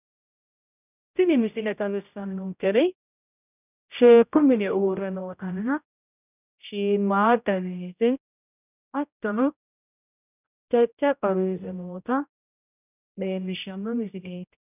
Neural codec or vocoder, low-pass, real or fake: codec, 16 kHz, 0.5 kbps, X-Codec, HuBERT features, trained on general audio; 3.6 kHz; fake